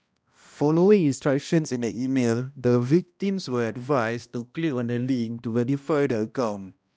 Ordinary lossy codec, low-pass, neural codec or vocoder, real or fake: none; none; codec, 16 kHz, 1 kbps, X-Codec, HuBERT features, trained on balanced general audio; fake